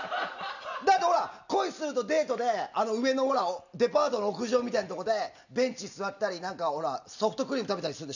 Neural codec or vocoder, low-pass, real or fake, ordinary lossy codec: none; 7.2 kHz; real; none